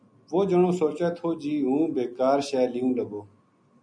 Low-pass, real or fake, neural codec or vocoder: 9.9 kHz; real; none